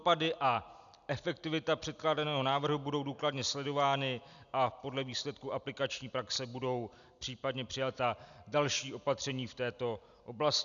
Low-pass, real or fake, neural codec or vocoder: 7.2 kHz; real; none